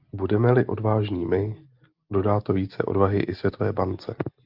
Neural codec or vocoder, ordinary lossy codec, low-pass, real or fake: none; Opus, 32 kbps; 5.4 kHz; real